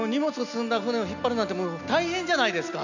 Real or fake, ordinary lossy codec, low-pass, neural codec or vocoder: real; none; 7.2 kHz; none